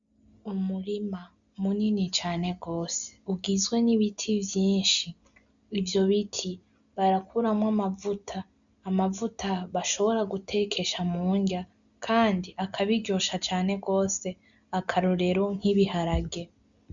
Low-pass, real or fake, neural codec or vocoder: 7.2 kHz; real; none